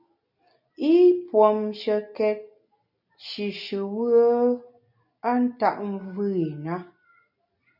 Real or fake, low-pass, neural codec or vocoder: real; 5.4 kHz; none